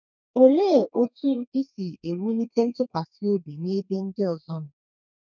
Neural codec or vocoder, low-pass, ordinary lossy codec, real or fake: codec, 32 kHz, 1.9 kbps, SNAC; 7.2 kHz; none; fake